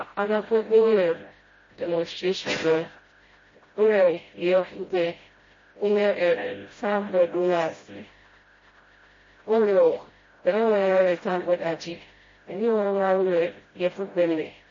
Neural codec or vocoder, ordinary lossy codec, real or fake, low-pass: codec, 16 kHz, 0.5 kbps, FreqCodec, smaller model; MP3, 32 kbps; fake; 7.2 kHz